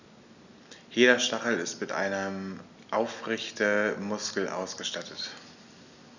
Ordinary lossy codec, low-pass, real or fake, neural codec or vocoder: none; 7.2 kHz; real; none